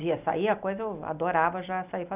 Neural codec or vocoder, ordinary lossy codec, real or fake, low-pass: none; none; real; 3.6 kHz